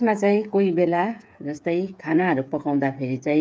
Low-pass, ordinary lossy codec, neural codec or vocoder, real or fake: none; none; codec, 16 kHz, 8 kbps, FreqCodec, smaller model; fake